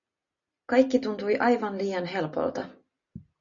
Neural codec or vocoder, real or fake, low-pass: none; real; 7.2 kHz